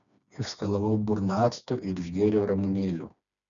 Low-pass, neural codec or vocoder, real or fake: 7.2 kHz; codec, 16 kHz, 2 kbps, FreqCodec, smaller model; fake